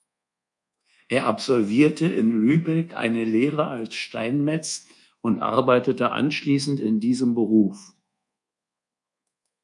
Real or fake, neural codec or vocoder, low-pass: fake; codec, 24 kHz, 1.2 kbps, DualCodec; 10.8 kHz